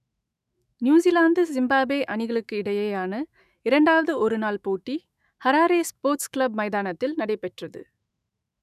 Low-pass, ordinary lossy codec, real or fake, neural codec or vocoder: 14.4 kHz; none; fake; autoencoder, 48 kHz, 128 numbers a frame, DAC-VAE, trained on Japanese speech